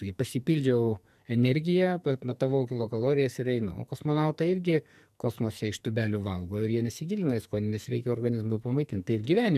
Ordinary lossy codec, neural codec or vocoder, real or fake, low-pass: MP3, 96 kbps; codec, 44.1 kHz, 2.6 kbps, SNAC; fake; 14.4 kHz